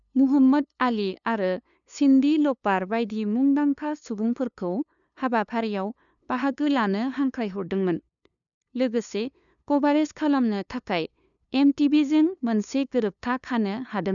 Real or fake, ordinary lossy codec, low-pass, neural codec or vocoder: fake; none; 7.2 kHz; codec, 16 kHz, 2 kbps, FunCodec, trained on LibriTTS, 25 frames a second